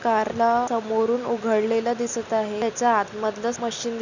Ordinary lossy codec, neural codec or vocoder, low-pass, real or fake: none; none; 7.2 kHz; real